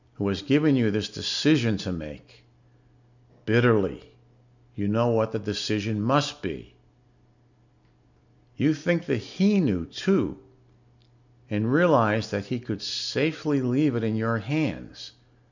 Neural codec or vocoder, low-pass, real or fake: none; 7.2 kHz; real